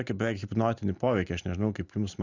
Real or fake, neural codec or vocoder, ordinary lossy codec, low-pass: real; none; Opus, 64 kbps; 7.2 kHz